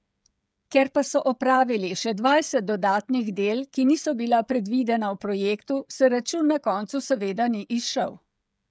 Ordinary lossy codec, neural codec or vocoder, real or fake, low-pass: none; codec, 16 kHz, 16 kbps, FreqCodec, smaller model; fake; none